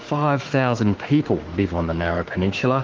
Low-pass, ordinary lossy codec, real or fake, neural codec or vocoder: 7.2 kHz; Opus, 16 kbps; fake; autoencoder, 48 kHz, 32 numbers a frame, DAC-VAE, trained on Japanese speech